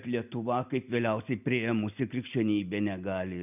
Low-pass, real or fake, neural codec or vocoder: 3.6 kHz; real; none